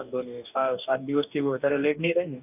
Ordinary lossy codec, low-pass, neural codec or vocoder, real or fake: none; 3.6 kHz; codec, 44.1 kHz, 2.6 kbps, DAC; fake